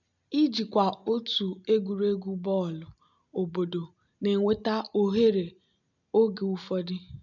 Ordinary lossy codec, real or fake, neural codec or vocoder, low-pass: none; real; none; 7.2 kHz